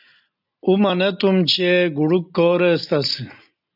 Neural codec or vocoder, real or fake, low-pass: none; real; 5.4 kHz